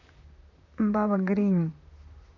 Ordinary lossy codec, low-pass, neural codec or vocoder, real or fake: none; 7.2 kHz; vocoder, 44.1 kHz, 128 mel bands, Pupu-Vocoder; fake